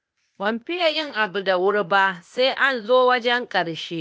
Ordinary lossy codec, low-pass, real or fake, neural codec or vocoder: none; none; fake; codec, 16 kHz, 0.8 kbps, ZipCodec